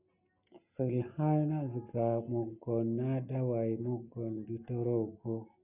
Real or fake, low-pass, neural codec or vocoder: real; 3.6 kHz; none